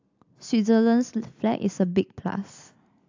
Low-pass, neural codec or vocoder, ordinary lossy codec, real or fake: 7.2 kHz; none; AAC, 48 kbps; real